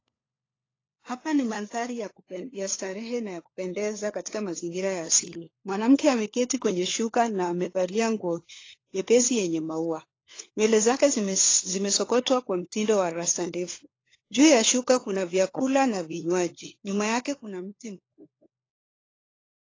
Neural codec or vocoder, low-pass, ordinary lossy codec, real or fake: codec, 16 kHz, 4 kbps, FunCodec, trained on LibriTTS, 50 frames a second; 7.2 kHz; AAC, 32 kbps; fake